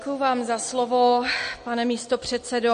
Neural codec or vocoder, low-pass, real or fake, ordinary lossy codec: none; 9.9 kHz; real; MP3, 48 kbps